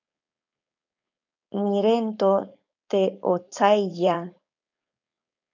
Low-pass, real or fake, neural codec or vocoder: 7.2 kHz; fake; codec, 16 kHz, 4.8 kbps, FACodec